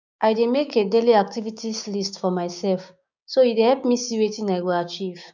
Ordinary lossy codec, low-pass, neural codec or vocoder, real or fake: none; 7.2 kHz; codec, 16 kHz, 6 kbps, DAC; fake